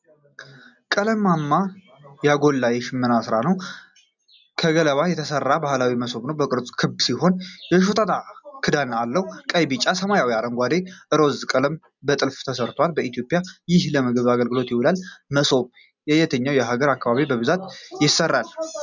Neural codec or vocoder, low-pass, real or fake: none; 7.2 kHz; real